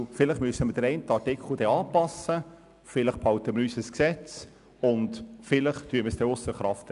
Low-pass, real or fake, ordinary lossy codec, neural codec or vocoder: 10.8 kHz; real; none; none